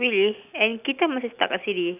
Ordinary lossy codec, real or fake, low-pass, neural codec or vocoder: none; real; 3.6 kHz; none